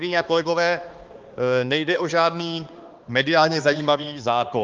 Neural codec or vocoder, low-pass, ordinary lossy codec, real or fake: codec, 16 kHz, 2 kbps, X-Codec, HuBERT features, trained on balanced general audio; 7.2 kHz; Opus, 32 kbps; fake